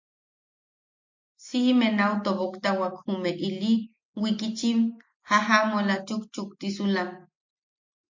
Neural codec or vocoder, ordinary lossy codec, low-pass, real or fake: none; MP3, 48 kbps; 7.2 kHz; real